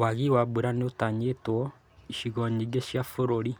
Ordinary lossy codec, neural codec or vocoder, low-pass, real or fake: none; none; none; real